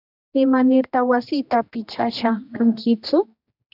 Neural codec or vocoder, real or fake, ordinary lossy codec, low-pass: codec, 16 kHz, 1 kbps, X-Codec, HuBERT features, trained on general audio; fake; AAC, 48 kbps; 5.4 kHz